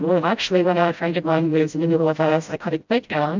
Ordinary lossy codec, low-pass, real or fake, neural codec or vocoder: MP3, 64 kbps; 7.2 kHz; fake; codec, 16 kHz, 0.5 kbps, FreqCodec, smaller model